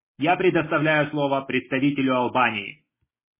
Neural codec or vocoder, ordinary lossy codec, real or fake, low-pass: none; MP3, 16 kbps; real; 3.6 kHz